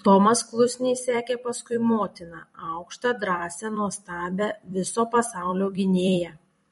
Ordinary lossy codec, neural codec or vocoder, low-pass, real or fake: MP3, 48 kbps; vocoder, 44.1 kHz, 128 mel bands every 256 samples, BigVGAN v2; 19.8 kHz; fake